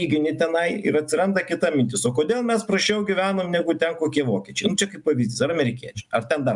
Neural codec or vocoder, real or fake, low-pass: none; real; 10.8 kHz